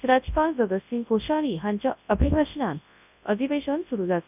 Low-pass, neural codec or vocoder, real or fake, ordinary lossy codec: 3.6 kHz; codec, 24 kHz, 0.9 kbps, WavTokenizer, large speech release; fake; none